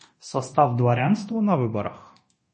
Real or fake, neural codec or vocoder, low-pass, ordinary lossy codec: fake; codec, 24 kHz, 0.9 kbps, DualCodec; 10.8 kHz; MP3, 32 kbps